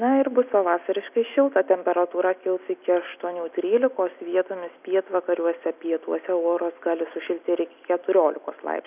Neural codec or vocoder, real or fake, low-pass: none; real; 3.6 kHz